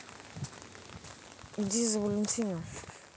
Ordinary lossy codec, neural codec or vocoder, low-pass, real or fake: none; none; none; real